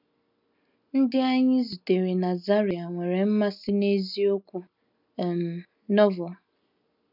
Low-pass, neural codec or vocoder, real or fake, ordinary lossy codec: 5.4 kHz; none; real; none